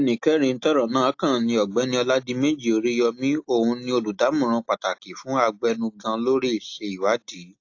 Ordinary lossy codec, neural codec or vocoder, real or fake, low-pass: AAC, 48 kbps; none; real; 7.2 kHz